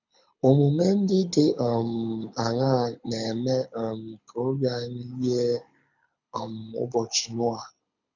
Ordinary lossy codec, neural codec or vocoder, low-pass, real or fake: none; codec, 24 kHz, 6 kbps, HILCodec; 7.2 kHz; fake